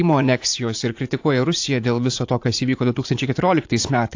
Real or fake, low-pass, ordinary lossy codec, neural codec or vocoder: fake; 7.2 kHz; AAC, 48 kbps; vocoder, 44.1 kHz, 80 mel bands, Vocos